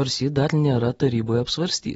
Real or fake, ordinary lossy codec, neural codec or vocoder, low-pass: real; AAC, 24 kbps; none; 10.8 kHz